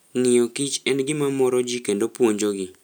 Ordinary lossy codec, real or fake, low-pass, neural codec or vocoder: none; real; none; none